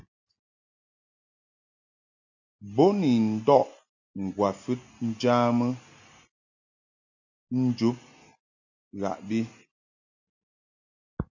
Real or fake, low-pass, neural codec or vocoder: real; 7.2 kHz; none